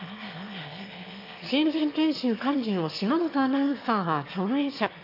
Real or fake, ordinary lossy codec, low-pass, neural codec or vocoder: fake; AAC, 32 kbps; 5.4 kHz; autoencoder, 22.05 kHz, a latent of 192 numbers a frame, VITS, trained on one speaker